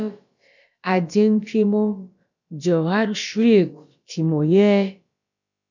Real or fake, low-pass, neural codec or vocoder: fake; 7.2 kHz; codec, 16 kHz, about 1 kbps, DyCAST, with the encoder's durations